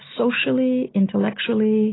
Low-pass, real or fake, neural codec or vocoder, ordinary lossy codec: 7.2 kHz; real; none; AAC, 16 kbps